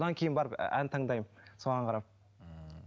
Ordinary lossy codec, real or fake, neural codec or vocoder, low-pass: none; real; none; none